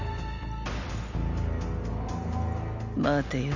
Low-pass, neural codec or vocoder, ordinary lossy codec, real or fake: 7.2 kHz; none; none; real